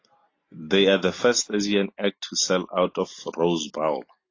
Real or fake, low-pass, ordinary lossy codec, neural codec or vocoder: real; 7.2 kHz; AAC, 32 kbps; none